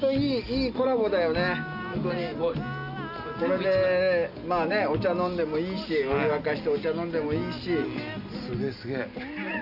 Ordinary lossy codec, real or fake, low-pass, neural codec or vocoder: none; real; 5.4 kHz; none